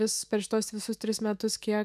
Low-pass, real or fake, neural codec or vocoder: 14.4 kHz; fake; autoencoder, 48 kHz, 128 numbers a frame, DAC-VAE, trained on Japanese speech